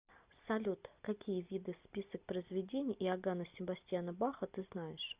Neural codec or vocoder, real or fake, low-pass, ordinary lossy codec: none; real; 3.6 kHz; Opus, 64 kbps